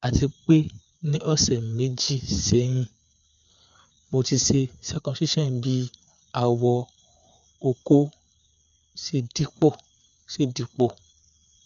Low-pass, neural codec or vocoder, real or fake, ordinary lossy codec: 7.2 kHz; codec, 16 kHz, 4 kbps, FreqCodec, larger model; fake; none